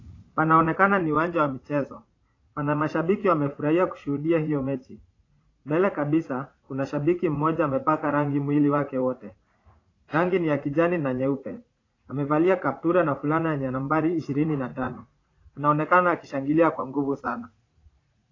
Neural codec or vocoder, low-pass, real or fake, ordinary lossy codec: vocoder, 44.1 kHz, 80 mel bands, Vocos; 7.2 kHz; fake; AAC, 32 kbps